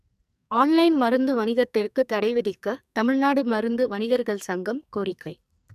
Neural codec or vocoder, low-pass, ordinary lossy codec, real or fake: codec, 44.1 kHz, 2.6 kbps, SNAC; 14.4 kHz; none; fake